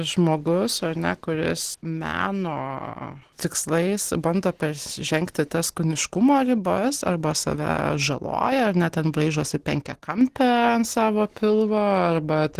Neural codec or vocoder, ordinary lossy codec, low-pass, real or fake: none; Opus, 16 kbps; 14.4 kHz; real